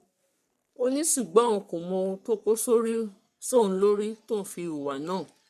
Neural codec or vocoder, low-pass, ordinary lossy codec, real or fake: codec, 44.1 kHz, 3.4 kbps, Pupu-Codec; 14.4 kHz; none; fake